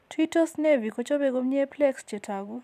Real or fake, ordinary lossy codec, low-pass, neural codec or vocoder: fake; none; 14.4 kHz; vocoder, 44.1 kHz, 128 mel bands every 256 samples, BigVGAN v2